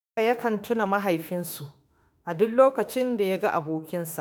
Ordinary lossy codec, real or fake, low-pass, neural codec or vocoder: none; fake; none; autoencoder, 48 kHz, 32 numbers a frame, DAC-VAE, trained on Japanese speech